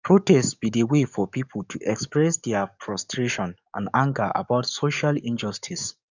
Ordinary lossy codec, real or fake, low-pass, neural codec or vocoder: none; fake; 7.2 kHz; codec, 44.1 kHz, 7.8 kbps, DAC